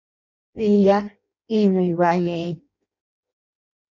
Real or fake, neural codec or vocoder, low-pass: fake; codec, 16 kHz in and 24 kHz out, 0.6 kbps, FireRedTTS-2 codec; 7.2 kHz